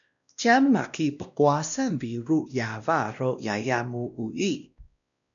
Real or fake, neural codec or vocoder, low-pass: fake; codec, 16 kHz, 1 kbps, X-Codec, WavLM features, trained on Multilingual LibriSpeech; 7.2 kHz